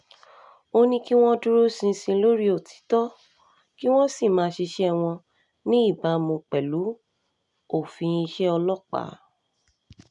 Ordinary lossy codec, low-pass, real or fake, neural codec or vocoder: none; 10.8 kHz; real; none